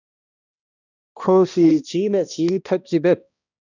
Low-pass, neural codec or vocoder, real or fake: 7.2 kHz; codec, 16 kHz, 1 kbps, X-Codec, HuBERT features, trained on balanced general audio; fake